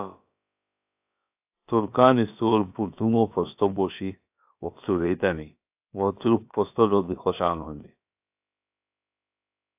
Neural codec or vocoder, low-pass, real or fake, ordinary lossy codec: codec, 16 kHz, about 1 kbps, DyCAST, with the encoder's durations; 3.6 kHz; fake; AAC, 32 kbps